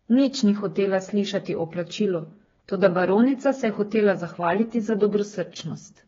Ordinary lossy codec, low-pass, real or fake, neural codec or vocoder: AAC, 24 kbps; 7.2 kHz; fake; codec, 16 kHz, 4 kbps, FreqCodec, smaller model